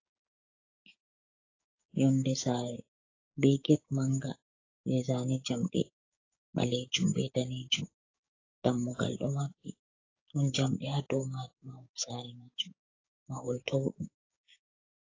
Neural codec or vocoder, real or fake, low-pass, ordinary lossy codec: codec, 16 kHz, 6 kbps, DAC; fake; 7.2 kHz; AAC, 32 kbps